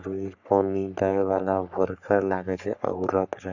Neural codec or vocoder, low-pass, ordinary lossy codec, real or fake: codec, 44.1 kHz, 3.4 kbps, Pupu-Codec; 7.2 kHz; none; fake